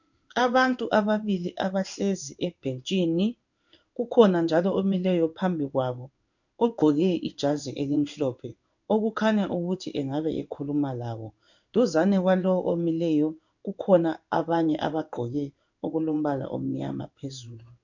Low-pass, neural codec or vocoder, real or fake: 7.2 kHz; codec, 16 kHz in and 24 kHz out, 1 kbps, XY-Tokenizer; fake